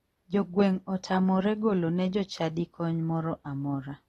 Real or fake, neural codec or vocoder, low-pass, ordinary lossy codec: real; none; 19.8 kHz; AAC, 32 kbps